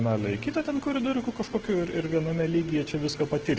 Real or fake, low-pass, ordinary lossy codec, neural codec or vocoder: real; 7.2 kHz; Opus, 16 kbps; none